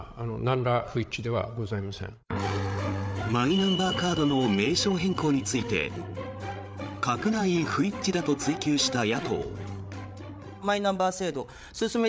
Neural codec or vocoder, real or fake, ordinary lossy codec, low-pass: codec, 16 kHz, 8 kbps, FreqCodec, larger model; fake; none; none